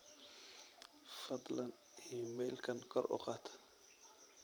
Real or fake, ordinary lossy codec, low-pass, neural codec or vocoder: fake; none; none; vocoder, 44.1 kHz, 128 mel bands every 512 samples, BigVGAN v2